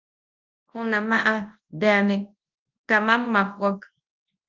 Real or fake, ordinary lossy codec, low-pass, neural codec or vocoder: fake; Opus, 24 kbps; 7.2 kHz; codec, 24 kHz, 0.9 kbps, WavTokenizer, large speech release